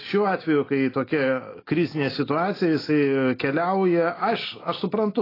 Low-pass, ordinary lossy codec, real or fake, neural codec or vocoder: 5.4 kHz; AAC, 24 kbps; real; none